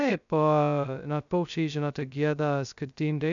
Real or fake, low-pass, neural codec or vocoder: fake; 7.2 kHz; codec, 16 kHz, 0.2 kbps, FocalCodec